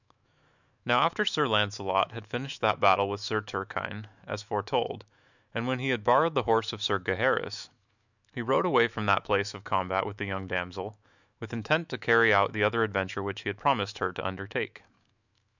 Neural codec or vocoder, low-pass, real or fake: autoencoder, 48 kHz, 128 numbers a frame, DAC-VAE, trained on Japanese speech; 7.2 kHz; fake